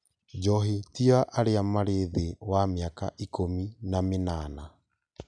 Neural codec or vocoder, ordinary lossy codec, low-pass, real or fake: none; none; 9.9 kHz; real